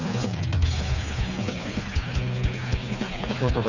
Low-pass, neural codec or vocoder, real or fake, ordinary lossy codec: 7.2 kHz; codec, 16 kHz, 4 kbps, FreqCodec, smaller model; fake; none